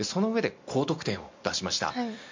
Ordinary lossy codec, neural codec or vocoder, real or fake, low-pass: MP3, 48 kbps; none; real; 7.2 kHz